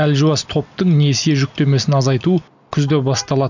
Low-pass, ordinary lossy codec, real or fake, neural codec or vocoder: 7.2 kHz; none; real; none